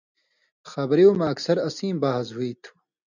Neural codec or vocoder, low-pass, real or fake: none; 7.2 kHz; real